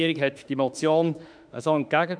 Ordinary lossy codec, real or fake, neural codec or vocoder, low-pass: MP3, 96 kbps; fake; autoencoder, 48 kHz, 32 numbers a frame, DAC-VAE, trained on Japanese speech; 9.9 kHz